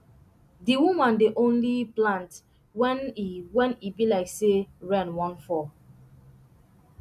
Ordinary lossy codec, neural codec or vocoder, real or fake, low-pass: none; none; real; 14.4 kHz